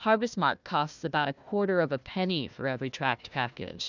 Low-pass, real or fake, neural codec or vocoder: 7.2 kHz; fake; codec, 16 kHz, 1 kbps, FunCodec, trained on Chinese and English, 50 frames a second